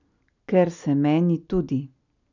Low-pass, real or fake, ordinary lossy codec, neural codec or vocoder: 7.2 kHz; real; none; none